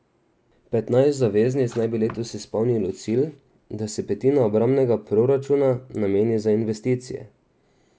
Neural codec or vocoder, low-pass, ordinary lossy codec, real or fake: none; none; none; real